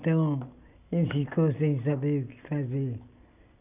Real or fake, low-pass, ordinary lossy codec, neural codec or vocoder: real; 3.6 kHz; none; none